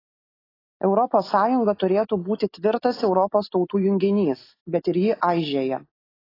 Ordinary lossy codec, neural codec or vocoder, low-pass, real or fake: AAC, 24 kbps; none; 5.4 kHz; real